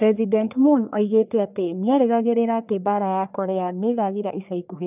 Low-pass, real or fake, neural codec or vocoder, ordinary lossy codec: 3.6 kHz; fake; codec, 44.1 kHz, 1.7 kbps, Pupu-Codec; none